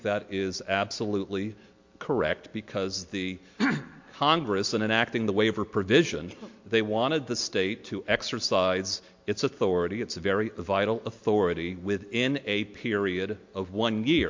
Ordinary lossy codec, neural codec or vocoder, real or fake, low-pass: MP3, 48 kbps; none; real; 7.2 kHz